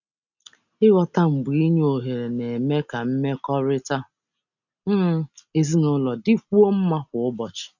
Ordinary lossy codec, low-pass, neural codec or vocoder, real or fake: none; 7.2 kHz; none; real